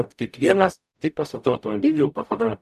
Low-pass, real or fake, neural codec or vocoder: 14.4 kHz; fake; codec, 44.1 kHz, 0.9 kbps, DAC